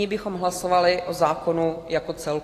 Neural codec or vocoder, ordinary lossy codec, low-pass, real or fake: none; AAC, 48 kbps; 14.4 kHz; real